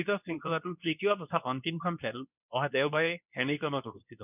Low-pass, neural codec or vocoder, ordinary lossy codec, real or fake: 3.6 kHz; codec, 24 kHz, 0.9 kbps, WavTokenizer, medium speech release version 2; none; fake